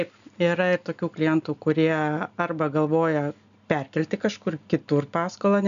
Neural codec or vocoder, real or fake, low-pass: none; real; 7.2 kHz